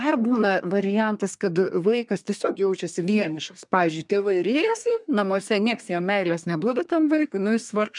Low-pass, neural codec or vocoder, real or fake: 10.8 kHz; codec, 24 kHz, 1 kbps, SNAC; fake